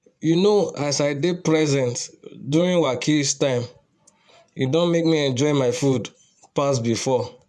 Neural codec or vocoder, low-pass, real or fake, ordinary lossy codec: vocoder, 24 kHz, 100 mel bands, Vocos; none; fake; none